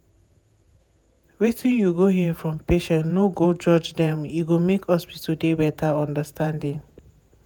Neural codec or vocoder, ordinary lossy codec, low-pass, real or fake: vocoder, 48 kHz, 128 mel bands, Vocos; none; none; fake